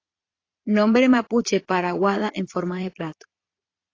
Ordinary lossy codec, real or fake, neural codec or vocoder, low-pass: AAC, 32 kbps; fake; vocoder, 22.05 kHz, 80 mel bands, Vocos; 7.2 kHz